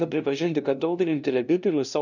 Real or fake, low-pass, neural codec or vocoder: fake; 7.2 kHz; codec, 16 kHz, 0.5 kbps, FunCodec, trained on LibriTTS, 25 frames a second